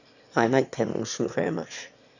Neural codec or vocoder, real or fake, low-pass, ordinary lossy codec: autoencoder, 22.05 kHz, a latent of 192 numbers a frame, VITS, trained on one speaker; fake; 7.2 kHz; none